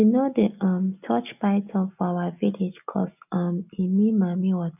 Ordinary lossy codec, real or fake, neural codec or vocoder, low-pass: none; real; none; 3.6 kHz